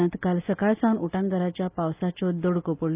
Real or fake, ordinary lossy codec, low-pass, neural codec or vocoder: real; Opus, 24 kbps; 3.6 kHz; none